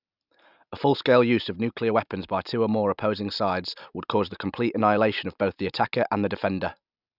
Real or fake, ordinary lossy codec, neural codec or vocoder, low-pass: real; none; none; 5.4 kHz